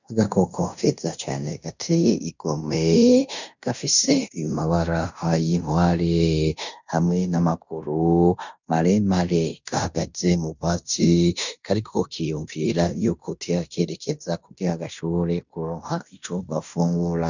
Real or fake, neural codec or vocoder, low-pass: fake; codec, 16 kHz in and 24 kHz out, 0.9 kbps, LongCat-Audio-Codec, fine tuned four codebook decoder; 7.2 kHz